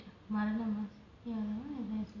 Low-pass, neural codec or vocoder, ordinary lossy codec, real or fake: 7.2 kHz; none; none; real